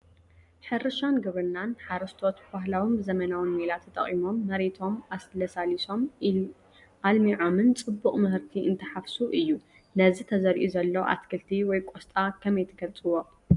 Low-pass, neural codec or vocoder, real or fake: 10.8 kHz; none; real